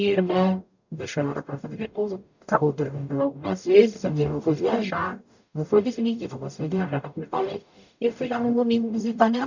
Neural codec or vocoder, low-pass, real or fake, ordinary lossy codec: codec, 44.1 kHz, 0.9 kbps, DAC; 7.2 kHz; fake; none